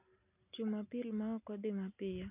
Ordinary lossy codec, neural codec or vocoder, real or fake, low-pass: none; none; real; 3.6 kHz